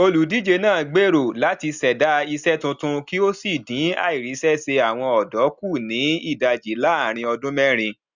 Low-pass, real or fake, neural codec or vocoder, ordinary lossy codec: 7.2 kHz; real; none; Opus, 64 kbps